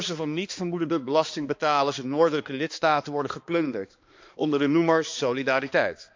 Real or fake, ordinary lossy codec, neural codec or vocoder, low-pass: fake; MP3, 64 kbps; codec, 16 kHz, 2 kbps, X-Codec, HuBERT features, trained on balanced general audio; 7.2 kHz